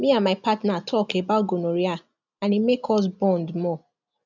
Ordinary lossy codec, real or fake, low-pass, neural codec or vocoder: none; real; 7.2 kHz; none